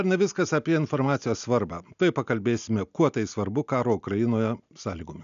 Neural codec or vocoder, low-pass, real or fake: none; 7.2 kHz; real